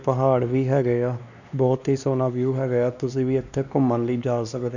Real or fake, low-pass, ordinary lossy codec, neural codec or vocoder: fake; 7.2 kHz; none; codec, 16 kHz, 2 kbps, X-Codec, WavLM features, trained on Multilingual LibriSpeech